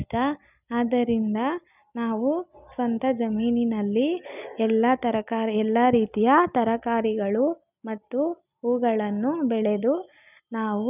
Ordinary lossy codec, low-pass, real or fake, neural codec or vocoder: none; 3.6 kHz; real; none